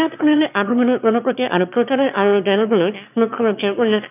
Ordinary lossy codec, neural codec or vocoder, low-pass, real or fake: none; autoencoder, 22.05 kHz, a latent of 192 numbers a frame, VITS, trained on one speaker; 3.6 kHz; fake